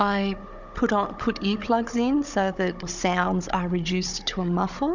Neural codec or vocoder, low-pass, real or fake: codec, 16 kHz, 16 kbps, FunCodec, trained on Chinese and English, 50 frames a second; 7.2 kHz; fake